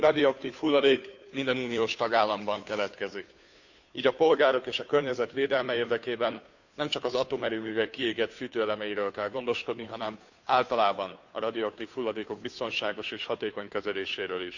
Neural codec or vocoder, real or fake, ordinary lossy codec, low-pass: codec, 16 kHz, 2 kbps, FunCodec, trained on Chinese and English, 25 frames a second; fake; none; 7.2 kHz